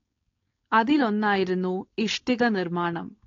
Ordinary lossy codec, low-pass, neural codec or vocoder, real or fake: AAC, 32 kbps; 7.2 kHz; codec, 16 kHz, 4.8 kbps, FACodec; fake